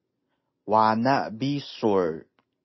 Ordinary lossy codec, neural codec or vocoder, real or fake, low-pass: MP3, 24 kbps; none; real; 7.2 kHz